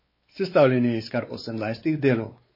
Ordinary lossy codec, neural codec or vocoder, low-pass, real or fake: MP3, 24 kbps; codec, 16 kHz, 4 kbps, X-Codec, WavLM features, trained on Multilingual LibriSpeech; 5.4 kHz; fake